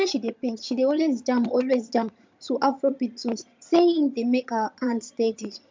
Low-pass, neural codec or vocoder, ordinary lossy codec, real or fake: 7.2 kHz; vocoder, 22.05 kHz, 80 mel bands, HiFi-GAN; MP3, 64 kbps; fake